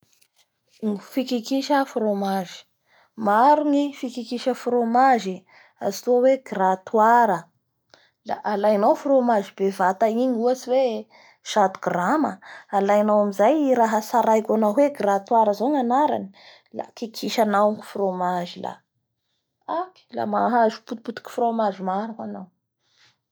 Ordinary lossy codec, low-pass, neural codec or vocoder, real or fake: none; none; none; real